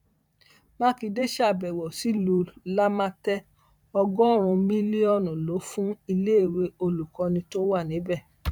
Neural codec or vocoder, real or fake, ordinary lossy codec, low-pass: vocoder, 44.1 kHz, 128 mel bands every 512 samples, BigVGAN v2; fake; none; 19.8 kHz